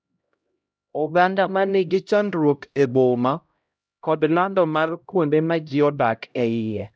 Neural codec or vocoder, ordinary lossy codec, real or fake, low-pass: codec, 16 kHz, 0.5 kbps, X-Codec, HuBERT features, trained on LibriSpeech; none; fake; none